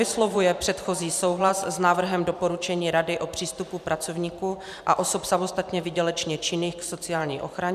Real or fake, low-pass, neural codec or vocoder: fake; 14.4 kHz; vocoder, 44.1 kHz, 128 mel bands every 512 samples, BigVGAN v2